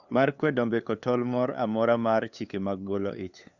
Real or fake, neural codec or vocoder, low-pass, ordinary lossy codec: fake; codec, 16 kHz, 2 kbps, FunCodec, trained on LibriTTS, 25 frames a second; 7.2 kHz; Opus, 64 kbps